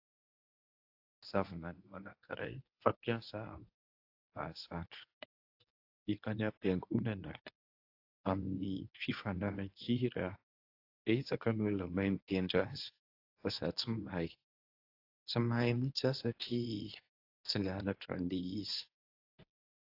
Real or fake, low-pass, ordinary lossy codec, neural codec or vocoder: fake; 5.4 kHz; AAC, 32 kbps; codec, 24 kHz, 0.9 kbps, WavTokenizer, medium speech release version 1